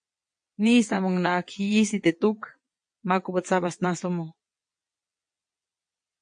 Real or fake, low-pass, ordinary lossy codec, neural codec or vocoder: fake; 9.9 kHz; MP3, 48 kbps; vocoder, 22.05 kHz, 80 mel bands, WaveNeXt